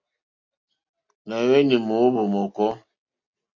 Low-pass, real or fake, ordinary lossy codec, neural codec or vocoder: 7.2 kHz; real; AAC, 48 kbps; none